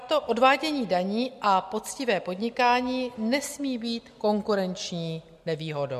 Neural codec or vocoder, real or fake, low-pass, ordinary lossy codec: none; real; 14.4 kHz; MP3, 64 kbps